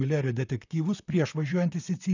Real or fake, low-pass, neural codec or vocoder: fake; 7.2 kHz; vocoder, 44.1 kHz, 128 mel bands, Pupu-Vocoder